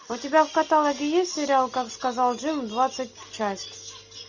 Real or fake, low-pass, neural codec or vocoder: real; 7.2 kHz; none